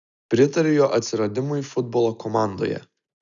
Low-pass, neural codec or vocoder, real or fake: 7.2 kHz; none; real